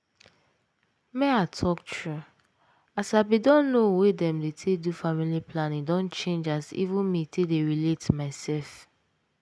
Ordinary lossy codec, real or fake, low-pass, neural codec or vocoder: none; real; none; none